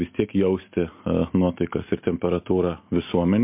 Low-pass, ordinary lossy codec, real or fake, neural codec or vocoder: 3.6 kHz; MP3, 32 kbps; real; none